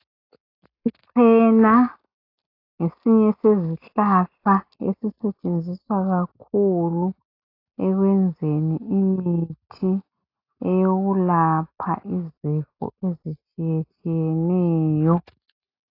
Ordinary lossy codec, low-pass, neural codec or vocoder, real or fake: AAC, 32 kbps; 5.4 kHz; none; real